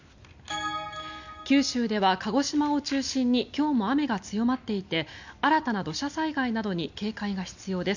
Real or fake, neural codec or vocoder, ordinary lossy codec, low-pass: real; none; none; 7.2 kHz